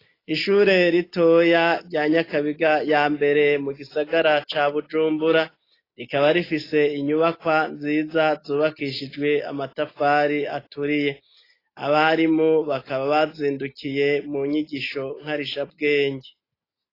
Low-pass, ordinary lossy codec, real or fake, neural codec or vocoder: 5.4 kHz; AAC, 24 kbps; real; none